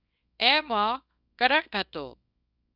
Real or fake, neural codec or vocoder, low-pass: fake; codec, 24 kHz, 0.9 kbps, WavTokenizer, small release; 5.4 kHz